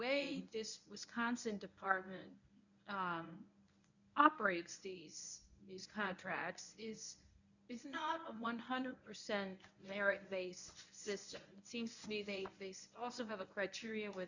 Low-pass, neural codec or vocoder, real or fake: 7.2 kHz; codec, 24 kHz, 0.9 kbps, WavTokenizer, medium speech release version 1; fake